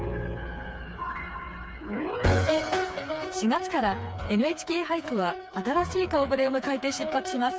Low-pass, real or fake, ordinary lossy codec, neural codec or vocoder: none; fake; none; codec, 16 kHz, 4 kbps, FreqCodec, smaller model